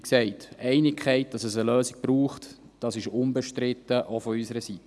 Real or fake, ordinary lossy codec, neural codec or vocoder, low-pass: real; none; none; none